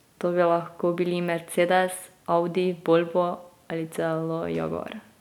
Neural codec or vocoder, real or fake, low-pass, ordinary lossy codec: none; real; 19.8 kHz; none